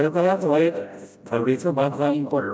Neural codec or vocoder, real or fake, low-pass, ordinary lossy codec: codec, 16 kHz, 0.5 kbps, FreqCodec, smaller model; fake; none; none